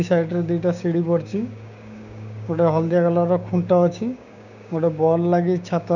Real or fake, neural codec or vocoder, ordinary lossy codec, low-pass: fake; codec, 16 kHz, 6 kbps, DAC; none; 7.2 kHz